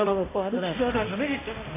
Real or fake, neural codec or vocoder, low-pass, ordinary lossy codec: fake; codec, 16 kHz, 0.5 kbps, X-Codec, HuBERT features, trained on balanced general audio; 3.6 kHz; AAC, 16 kbps